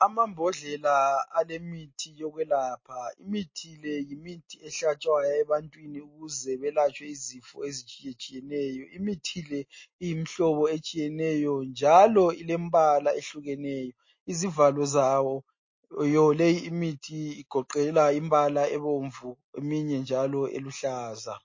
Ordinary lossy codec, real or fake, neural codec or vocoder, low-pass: MP3, 32 kbps; real; none; 7.2 kHz